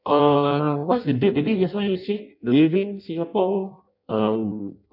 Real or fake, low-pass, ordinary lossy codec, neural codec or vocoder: fake; 5.4 kHz; none; codec, 16 kHz in and 24 kHz out, 0.6 kbps, FireRedTTS-2 codec